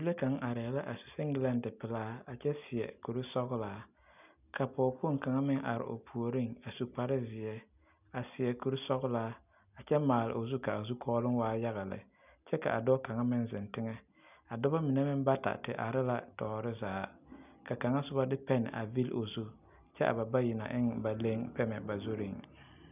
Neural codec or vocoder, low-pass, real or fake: none; 3.6 kHz; real